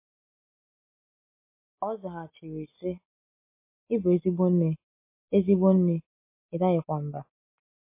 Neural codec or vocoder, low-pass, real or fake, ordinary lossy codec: none; 3.6 kHz; real; MP3, 24 kbps